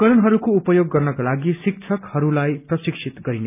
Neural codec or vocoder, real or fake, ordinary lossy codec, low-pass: none; real; none; 3.6 kHz